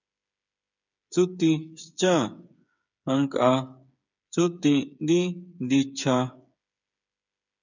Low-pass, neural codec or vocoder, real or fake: 7.2 kHz; codec, 16 kHz, 8 kbps, FreqCodec, smaller model; fake